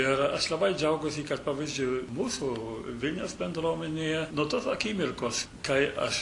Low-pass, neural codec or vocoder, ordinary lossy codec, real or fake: 10.8 kHz; none; AAC, 48 kbps; real